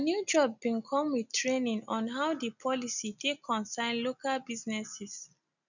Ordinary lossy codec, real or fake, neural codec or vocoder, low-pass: none; real; none; 7.2 kHz